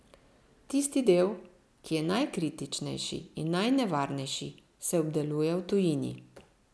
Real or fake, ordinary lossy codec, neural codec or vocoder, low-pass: real; none; none; none